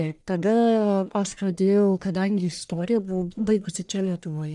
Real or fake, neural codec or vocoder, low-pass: fake; codec, 44.1 kHz, 1.7 kbps, Pupu-Codec; 10.8 kHz